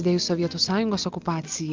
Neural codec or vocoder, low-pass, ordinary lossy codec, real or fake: none; 7.2 kHz; Opus, 24 kbps; real